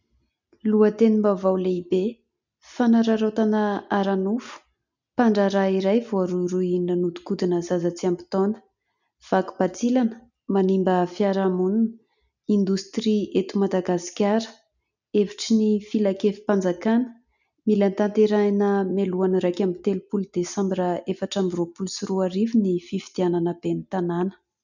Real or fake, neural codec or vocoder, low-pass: real; none; 7.2 kHz